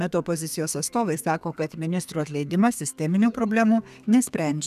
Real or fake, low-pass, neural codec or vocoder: fake; 14.4 kHz; codec, 32 kHz, 1.9 kbps, SNAC